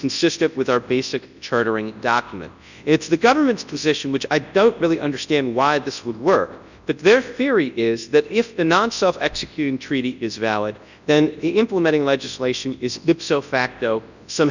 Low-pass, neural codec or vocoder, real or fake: 7.2 kHz; codec, 24 kHz, 0.9 kbps, WavTokenizer, large speech release; fake